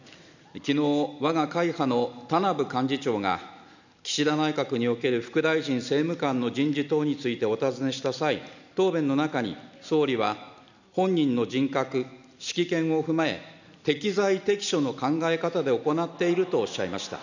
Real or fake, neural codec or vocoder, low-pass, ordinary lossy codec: real; none; 7.2 kHz; none